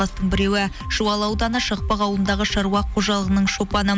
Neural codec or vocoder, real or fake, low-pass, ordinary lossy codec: none; real; none; none